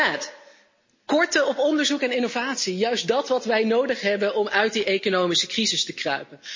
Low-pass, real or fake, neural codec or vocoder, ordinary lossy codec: 7.2 kHz; real; none; MP3, 32 kbps